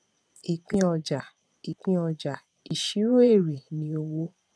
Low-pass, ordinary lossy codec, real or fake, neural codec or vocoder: none; none; fake; vocoder, 22.05 kHz, 80 mel bands, Vocos